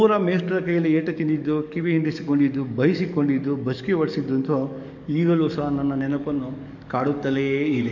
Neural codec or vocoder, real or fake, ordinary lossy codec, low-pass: codec, 44.1 kHz, 7.8 kbps, DAC; fake; none; 7.2 kHz